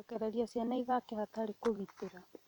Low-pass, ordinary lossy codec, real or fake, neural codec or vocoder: 19.8 kHz; none; fake; vocoder, 44.1 kHz, 128 mel bands every 256 samples, BigVGAN v2